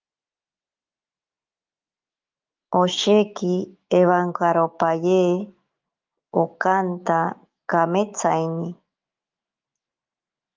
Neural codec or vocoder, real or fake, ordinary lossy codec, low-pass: autoencoder, 48 kHz, 128 numbers a frame, DAC-VAE, trained on Japanese speech; fake; Opus, 32 kbps; 7.2 kHz